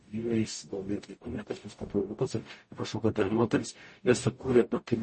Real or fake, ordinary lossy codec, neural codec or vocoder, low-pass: fake; MP3, 32 kbps; codec, 44.1 kHz, 0.9 kbps, DAC; 10.8 kHz